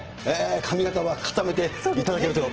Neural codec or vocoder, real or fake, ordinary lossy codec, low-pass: none; real; Opus, 16 kbps; 7.2 kHz